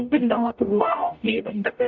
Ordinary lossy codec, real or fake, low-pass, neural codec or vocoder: MP3, 64 kbps; fake; 7.2 kHz; codec, 44.1 kHz, 0.9 kbps, DAC